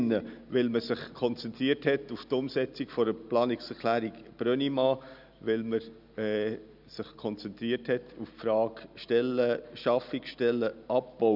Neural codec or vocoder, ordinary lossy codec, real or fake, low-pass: none; none; real; 5.4 kHz